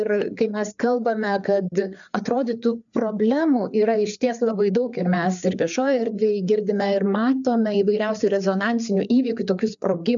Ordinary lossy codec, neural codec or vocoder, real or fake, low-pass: MP3, 96 kbps; codec, 16 kHz, 4 kbps, FreqCodec, larger model; fake; 7.2 kHz